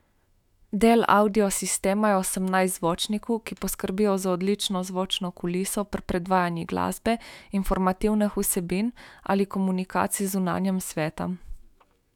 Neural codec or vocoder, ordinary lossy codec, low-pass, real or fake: autoencoder, 48 kHz, 128 numbers a frame, DAC-VAE, trained on Japanese speech; none; 19.8 kHz; fake